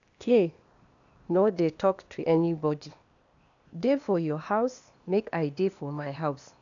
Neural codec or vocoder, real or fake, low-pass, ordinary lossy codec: codec, 16 kHz, 0.8 kbps, ZipCodec; fake; 7.2 kHz; none